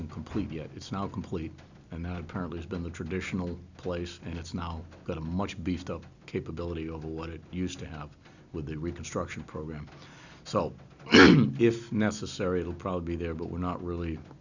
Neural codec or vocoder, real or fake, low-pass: none; real; 7.2 kHz